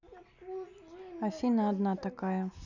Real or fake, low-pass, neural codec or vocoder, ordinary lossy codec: real; 7.2 kHz; none; none